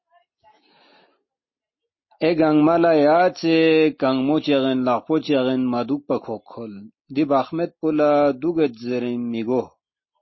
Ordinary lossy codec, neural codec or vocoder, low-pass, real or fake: MP3, 24 kbps; none; 7.2 kHz; real